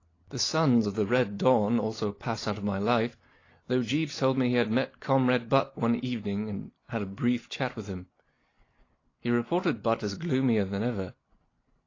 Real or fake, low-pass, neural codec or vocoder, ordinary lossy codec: real; 7.2 kHz; none; AAC, 32 kbps